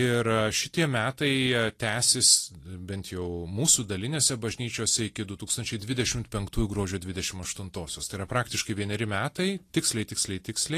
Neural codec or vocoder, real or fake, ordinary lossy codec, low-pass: none; real; AAC, 48 kbps; 14.4 kHz